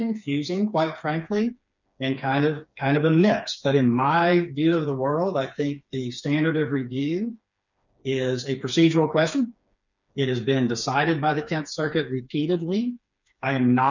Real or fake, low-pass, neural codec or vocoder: fake; 7.2 kHz; codec, 16 kHz, 4 kbps, FreqCodec, smaller model